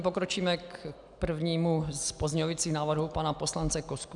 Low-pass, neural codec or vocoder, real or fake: 10.8 kHz; none; real